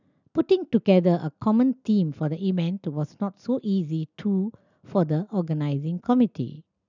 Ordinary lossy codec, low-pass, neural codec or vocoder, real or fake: none; 7.2 kHz; none; real